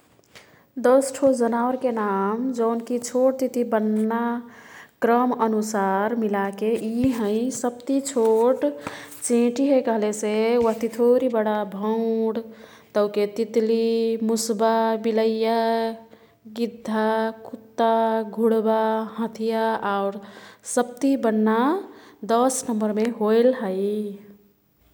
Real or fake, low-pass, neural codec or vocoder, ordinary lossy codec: real; 19.8 kHz; none; none